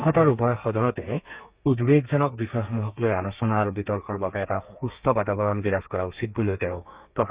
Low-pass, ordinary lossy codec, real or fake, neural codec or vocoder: 3.6 kHz; Opus, 64 kbps; fake; codec, 32 kHz, 1.9 kbps, SNAC